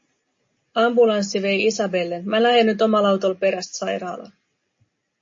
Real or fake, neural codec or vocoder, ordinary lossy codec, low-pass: real; none; MP3, 32 kbps; 7.2 kHz